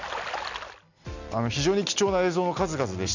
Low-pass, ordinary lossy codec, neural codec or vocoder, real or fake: 7.2 kHz; none; none; real